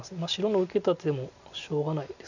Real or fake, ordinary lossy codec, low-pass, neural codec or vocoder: real; none; 7.2 kHz; none